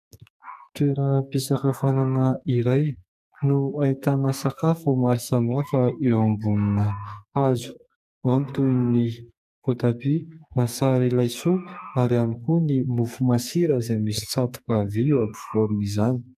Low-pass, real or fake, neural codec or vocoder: 14.4 kHz; fake; codec, 44.1 kHz, 2.6 kbps, SNAC